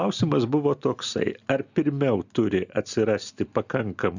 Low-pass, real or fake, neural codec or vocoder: 7.2 kHz; real; none